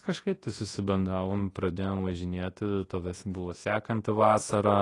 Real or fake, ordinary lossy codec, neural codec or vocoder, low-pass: fake; AAC, 32 kbps; codec, 24 kHz, 0.9 kbps, WavTokenizer, large speech release; 10.8 kHz